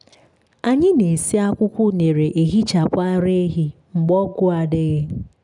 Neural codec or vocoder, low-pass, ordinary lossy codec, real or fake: none; 10.8 kHz; none; real